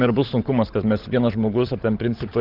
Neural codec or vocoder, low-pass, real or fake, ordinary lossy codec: codec, 16 kHz, 16 kbps, FunCodec, trained on LibriTTS, 50 frames a second; 5.4 kHz; fake; Opus, 16 kbps